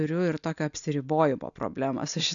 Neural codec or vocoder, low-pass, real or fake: none; 7.2 kHz; real